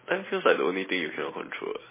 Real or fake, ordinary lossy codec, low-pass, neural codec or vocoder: real; MP3, 16 kbps; 3.6 kHz; none